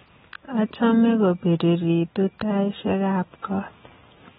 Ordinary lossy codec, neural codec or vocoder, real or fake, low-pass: AAC, 16 kbps; none; real; 19.8 kHz